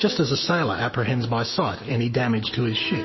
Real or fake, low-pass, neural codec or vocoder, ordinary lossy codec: fake; 7.2 kHz; autoencoder, 48 kHz, 128 numbers a frame, DAC-VAE, trained on Japanese speech; MP3, 24 kbps